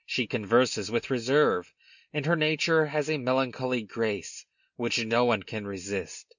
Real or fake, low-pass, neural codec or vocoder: real; 7.2 kHz; none